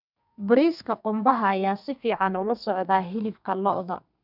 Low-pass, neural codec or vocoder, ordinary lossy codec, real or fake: 5.4 kHz; codec, 32 kHz, 1.9 kbps, SNAC; none; fake